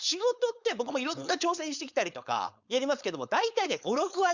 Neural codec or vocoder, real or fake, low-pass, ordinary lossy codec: codec, 16 kHz, 4.8 kbps, FACodec; fake; 7.2 kHz; Opus, 64 kbps